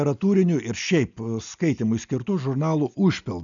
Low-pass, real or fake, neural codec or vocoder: 7.2 kHz; real; none